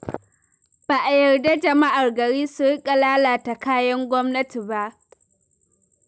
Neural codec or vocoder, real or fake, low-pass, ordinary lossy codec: none; real; none; none